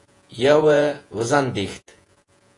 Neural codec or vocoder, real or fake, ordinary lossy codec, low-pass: vocoder, 48 kHz, 128 mel bands, Vocos; fake; AAC, 48 kbps; 10.8 kHz